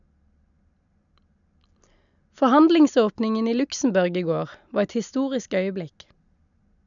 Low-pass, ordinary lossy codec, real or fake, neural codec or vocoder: 7.2 kHz; none; real; none